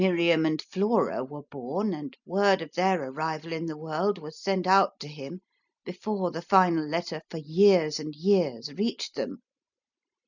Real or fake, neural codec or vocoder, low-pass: real; none; 7.2 kHz